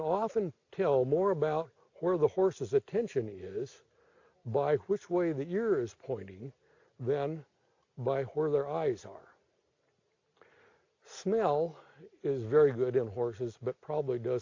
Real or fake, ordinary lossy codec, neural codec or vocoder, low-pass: real; AAC, 48 kbps; none; 7.2 kHz